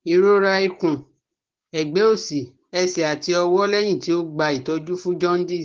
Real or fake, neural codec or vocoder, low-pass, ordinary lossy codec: fake; codec, 16 kHz, 6 kbps, DAC; 7.2 kHz; Opus, 16 kbps